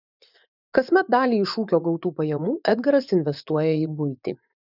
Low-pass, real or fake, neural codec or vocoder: 5.4 kHz; fake; vocoder, 24 kHz, 100 mel bands, Vocos